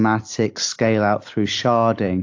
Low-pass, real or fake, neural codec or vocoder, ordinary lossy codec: 7.2 kHz; real; none; AAC, 48 kbps